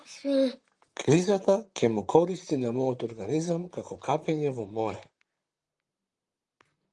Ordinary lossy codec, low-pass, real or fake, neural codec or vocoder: Opus, 32 kbps; 10.8 kHz; fake; vocoder, 44.1 kHz, 128 mel bands, Pupu-Vocoder